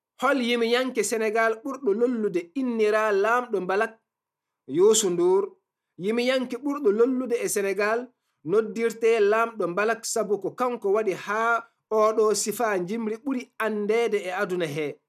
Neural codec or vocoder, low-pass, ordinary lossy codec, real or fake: none; 14.4 kHz; none; real